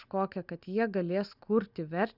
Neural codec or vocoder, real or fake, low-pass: none; real; 5.4 kHz